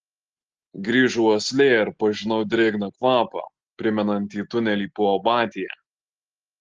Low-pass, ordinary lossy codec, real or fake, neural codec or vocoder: 7.2 kHz; Opus, 16 kbps; real; none